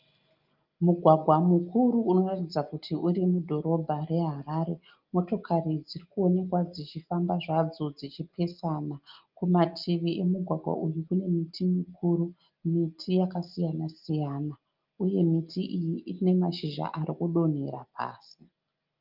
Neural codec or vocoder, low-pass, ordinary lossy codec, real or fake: none; 5.4 kHz; Opus, 24 kbps; real